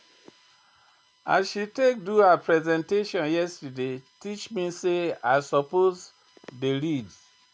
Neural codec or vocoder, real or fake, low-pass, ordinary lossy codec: none; real; none; none